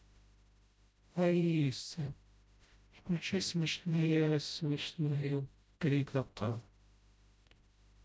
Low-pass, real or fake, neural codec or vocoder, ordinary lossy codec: none; fake; codec, 16 kHz, 0.5 kbps, FreqCodec, smaller model; none